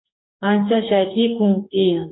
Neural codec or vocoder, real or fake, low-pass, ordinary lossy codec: codec, 44.1 kHz, 2.6 kbps, DAC; fake; 7.2 kHz; AAC, 16 kbps